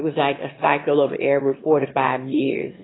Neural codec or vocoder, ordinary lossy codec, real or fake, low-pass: autoencoder, 22.05 kHz, a latent of 192 numbers a frame, VITS, trained on one speaker; AAC, 16 kbps; fake; 7.2 kHz